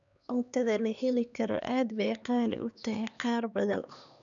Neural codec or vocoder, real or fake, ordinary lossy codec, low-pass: codec, 16 kHz, 2 kbps, X-Codec, HuBERT features, trained on LibriSpeech; fake; none; 7.2 kHz